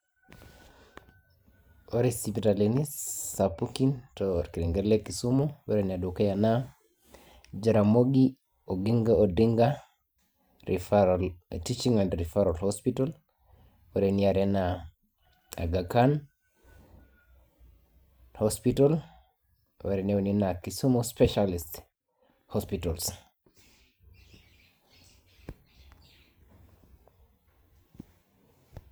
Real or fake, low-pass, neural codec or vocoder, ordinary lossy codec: fake; none; vocoder, 44.1 kHz, 128 mel bands every 512 samples, BigVGAN v2; none